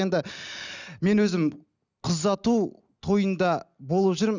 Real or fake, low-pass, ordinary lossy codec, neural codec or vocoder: real; 7.2 kHz; none; none